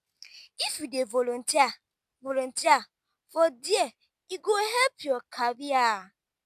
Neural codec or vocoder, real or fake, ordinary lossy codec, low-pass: none; real; none; 14.4 kHz